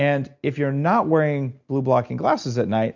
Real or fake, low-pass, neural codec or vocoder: real; 7.2 kHz; none